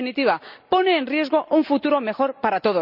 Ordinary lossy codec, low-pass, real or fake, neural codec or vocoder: none; 5.4 kHz; real; none